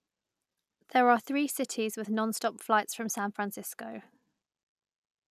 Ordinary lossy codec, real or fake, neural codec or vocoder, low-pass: none; real; none; 14.4 kHz